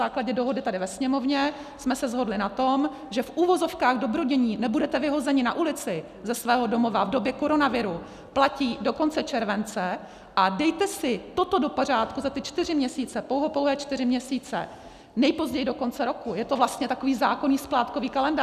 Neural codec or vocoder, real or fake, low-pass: none; real; 14.4 kHz